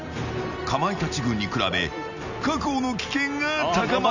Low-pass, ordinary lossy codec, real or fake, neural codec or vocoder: 7.2 kHz; none; real; none